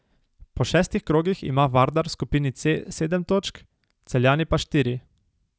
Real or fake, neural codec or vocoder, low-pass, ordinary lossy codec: real; none; none; none